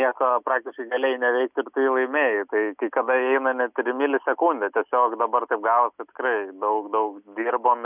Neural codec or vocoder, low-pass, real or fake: none; 3.6 kHz; real